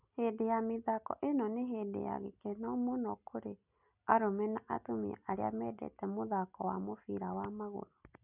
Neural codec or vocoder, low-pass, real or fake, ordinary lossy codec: none; 3.6 kHz; real; none